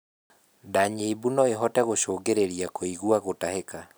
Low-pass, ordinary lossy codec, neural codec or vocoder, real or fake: none; none; none; real